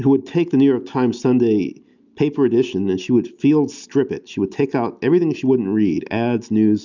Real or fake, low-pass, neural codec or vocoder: real; 7.2 kHz; none